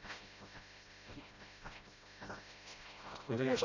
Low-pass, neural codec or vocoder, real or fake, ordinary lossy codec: 7.2 kHz; codec, 16 kHz, 0.5 kbps, FreqCodec, smaller model; fake; none